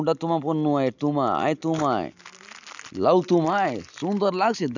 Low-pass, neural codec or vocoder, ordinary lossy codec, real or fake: 7.2 kHz; none; none; real